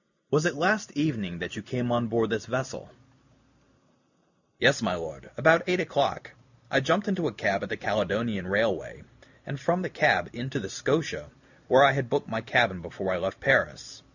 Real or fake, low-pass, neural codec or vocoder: real; 7.2 kHz; none